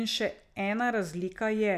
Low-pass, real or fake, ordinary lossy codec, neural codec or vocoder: 14.4 kHz; real; none; none